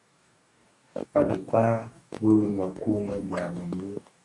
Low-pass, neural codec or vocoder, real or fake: 10.8 kHz; codec, 44.1 kHz, 2.6 kbps, DAC; fake